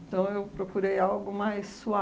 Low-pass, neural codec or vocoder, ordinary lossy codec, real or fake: none; none; none; real